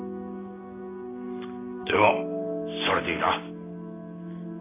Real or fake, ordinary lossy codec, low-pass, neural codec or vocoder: real; AAC, 16 kbps; 3.6 kHz; none